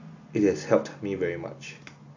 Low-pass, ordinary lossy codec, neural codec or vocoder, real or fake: 7.2 kHz; AAC, 48 kbps; none; real